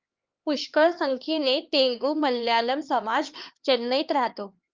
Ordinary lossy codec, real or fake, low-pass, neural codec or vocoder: Opus, 24 kbps; fake; 7.2 kHz; codec, 16 kHz, 2 kbps, X-Codec, HuBERT features, trained on LibriSpeech